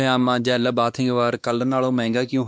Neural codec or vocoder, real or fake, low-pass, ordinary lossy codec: codec, 16 kHz, 2 kbps, X-Codec, WavLM features, trained on Multilingual LibriSpeech; fake; none; none